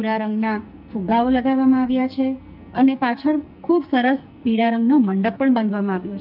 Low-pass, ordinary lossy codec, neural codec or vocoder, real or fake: 5.4 kHz; none; codec, 44.1 kHz, 2.6 kbps, SNAC; fake